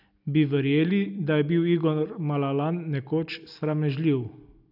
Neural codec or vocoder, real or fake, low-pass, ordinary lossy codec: none; real; 5.4 kHz; none